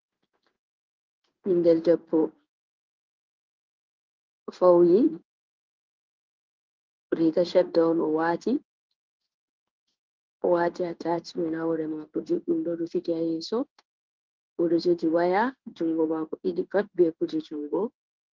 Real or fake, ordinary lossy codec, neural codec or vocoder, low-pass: fake; Opus, 32 kbps; codec, 16 kHz in and 24 kHz out, 1 kbps, XY-Tokenizer; 7.2 kHz